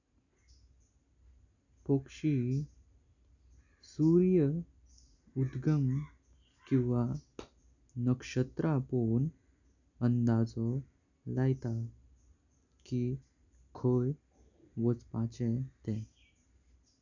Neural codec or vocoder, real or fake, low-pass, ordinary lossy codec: none; real; 7.2 kHz; none